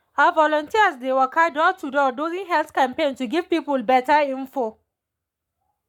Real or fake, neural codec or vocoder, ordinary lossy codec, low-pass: fake; codec, 44.1 kHz, 7.8 kbps, Pupu-Codec; none; 19.8 kHz